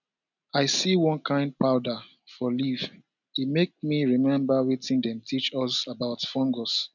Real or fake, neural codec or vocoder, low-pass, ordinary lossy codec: real; none; 7.2 kHz; none